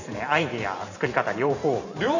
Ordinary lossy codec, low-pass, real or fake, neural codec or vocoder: none; 7.2 kHz; fake; vocoder, 44.1 kHz, 128 mel bands every 512 samples, BigVGAN v2